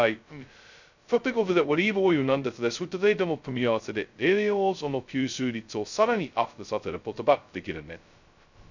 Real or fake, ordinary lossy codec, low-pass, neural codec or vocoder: fake; none; 7.2 kHz; codec, 16 kHz, 0.2 kbps, FocalCodec